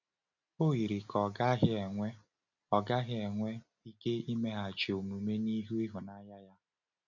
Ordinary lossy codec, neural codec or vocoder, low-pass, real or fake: none; none; 7.2 kHz; real